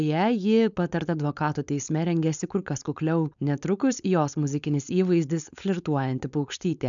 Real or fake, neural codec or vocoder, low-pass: fake; codec, 16 kHz, 4.8 kbps, FACodec; 7.2 kHz